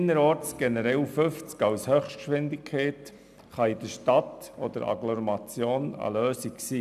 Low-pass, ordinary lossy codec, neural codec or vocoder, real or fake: 14.4 kHz; none; none; real